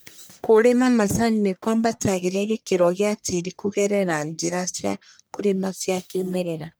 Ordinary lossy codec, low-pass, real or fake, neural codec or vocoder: none; none; fake; codec, 44.1 kHz, 1.7 kbps, Pupu-Codec